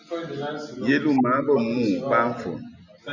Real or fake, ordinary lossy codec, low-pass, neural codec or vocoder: real; MP3, 64 kbps; 7.2 kHz; none